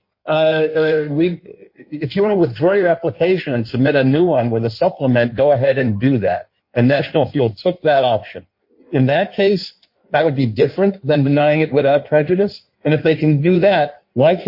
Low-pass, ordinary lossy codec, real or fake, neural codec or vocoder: 5.4 kHz; MP3, 32 kbps; fake; codec, 16 kHz in and 24 kHz out, 1.1 kbps, FireRedTTS-2 codec